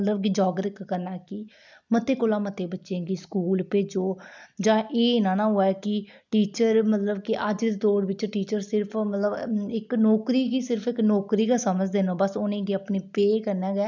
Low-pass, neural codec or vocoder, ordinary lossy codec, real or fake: 7.2 kHz; none; none; real